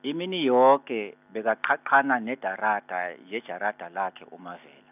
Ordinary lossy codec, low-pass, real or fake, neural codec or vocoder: none; 3.6 kHz; real; none